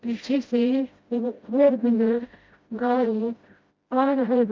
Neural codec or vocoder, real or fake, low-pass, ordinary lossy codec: codec, 16 kHz, 0.5 kbps, FreqCodec, smaller model; fake; 7.2 kHz; Opus, 24 kbps